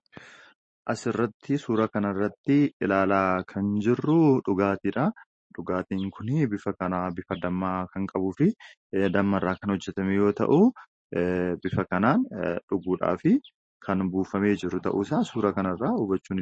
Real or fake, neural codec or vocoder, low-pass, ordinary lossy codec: real; none; 9.9 kHz; MP3, 32 kbps